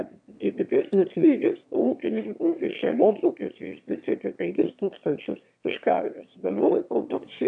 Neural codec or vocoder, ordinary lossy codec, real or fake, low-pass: autoencoder, 22.05 kHz, a latent of 192 numbers a frame, VITS, trained on one speaker; AAC, 64 kbps; fake; 9.9 kHz